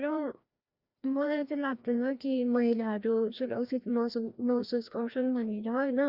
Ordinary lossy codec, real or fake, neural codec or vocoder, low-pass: Opus, 64 kbps; fake; codec, 16 kHz, 1 kbps, FreqCodec, larger model; 5.4 kHz